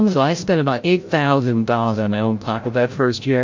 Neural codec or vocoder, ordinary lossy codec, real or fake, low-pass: codec, 16 kHz, 0.5 kbps, FreqCodec, larger model; MP3, 48 kbps; fake; 7.2 kHz